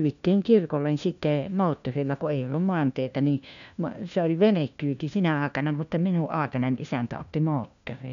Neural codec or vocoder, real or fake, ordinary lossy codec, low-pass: codec, 16 kHz, 1 kbps, FunCodec, trained on LibriTTS, 50 frames a second; fake; none; 7.2 kHz